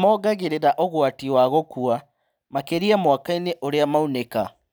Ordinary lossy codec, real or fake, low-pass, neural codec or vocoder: none; real; none; none